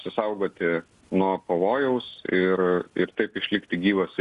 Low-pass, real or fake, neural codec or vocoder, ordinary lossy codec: 10.8 kHz; real; none; Opus, 64 kbps